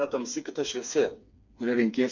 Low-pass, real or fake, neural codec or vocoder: 7.2 kHz; fake; codec, 16 kHz, 4 kbps, FreqCodec, smaller model